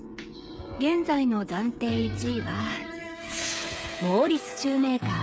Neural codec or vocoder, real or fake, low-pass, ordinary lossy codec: codec, 16 kHz, 8 kbps, FreqCodec, smaller model; fake; none; none